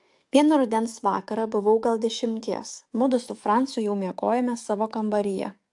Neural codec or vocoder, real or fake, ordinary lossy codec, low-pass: codec, 44.1 kHz, 7.8 kbps, DAC; fake; AAC, 64 kbps; 10.8 kHz